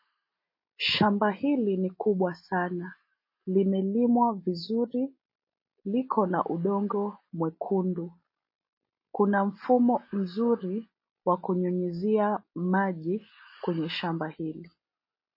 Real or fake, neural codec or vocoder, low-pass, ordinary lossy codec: real; none; 5.4 kHz; MP3, 24 kbps